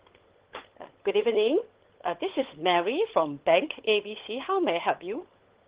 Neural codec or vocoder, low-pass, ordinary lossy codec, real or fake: codec, 16 kHz, 16 kbps, FunCodec, trained on LibriTTS, 50 frames a second; 3.6 kHz; Opus, 16 kbps; fake